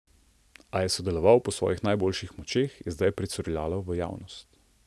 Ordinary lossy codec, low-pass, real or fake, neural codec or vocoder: none; none; real; none